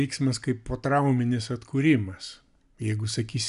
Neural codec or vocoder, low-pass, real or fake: none; 10.8 kHz; real